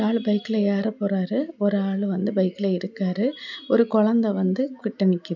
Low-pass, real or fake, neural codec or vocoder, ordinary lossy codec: 7.2 kHz; real; none; none